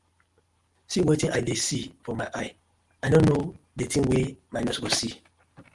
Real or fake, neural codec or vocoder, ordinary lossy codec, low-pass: real; none; Opus, 24 kbps; 10.8 kHz